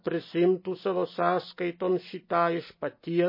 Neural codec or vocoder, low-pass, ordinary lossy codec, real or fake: none; 5.4 kHz; MP3, 24 kbps; real